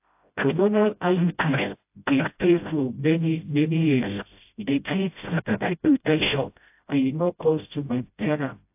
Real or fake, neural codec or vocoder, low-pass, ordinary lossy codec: fake; codec, 16 kHz, 0.5 kbps, FreqCodec, smaller model; 3.6 kHz; AAC, 32 kbps